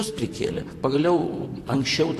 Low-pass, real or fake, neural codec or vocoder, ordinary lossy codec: 14.4 kHz; fake; vocoder, 44.1 kHz, 128 mel bands, Pupu-Vocoder; AAC, 64 kbps